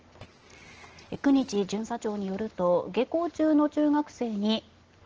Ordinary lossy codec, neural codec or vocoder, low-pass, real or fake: Opus, 16 kbps; none; 7.2 kHz; real